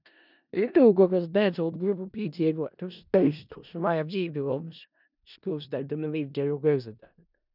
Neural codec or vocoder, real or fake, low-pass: codec, 16 kHz in and 24 kHz out, 0.4 kbps, LongCat-Audio-Codec, four codebook decoder; fake; 5.4 kHz